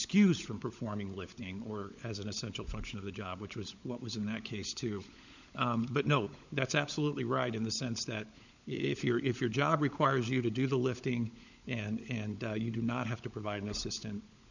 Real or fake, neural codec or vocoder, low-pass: fake; codec, 16 kHz, 16 kbps, FunCodec, trained on LibriTTS, 50 frames a second; 7.2 kHz